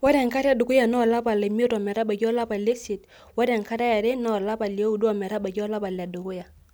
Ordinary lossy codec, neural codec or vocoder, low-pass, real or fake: none; none; none; real